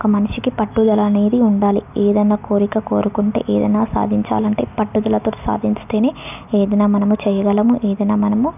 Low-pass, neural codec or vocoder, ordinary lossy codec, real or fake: 3.6 kHz; none; none; real